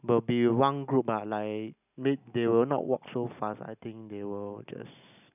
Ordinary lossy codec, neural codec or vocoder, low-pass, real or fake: none; none; 3.6 kHz; real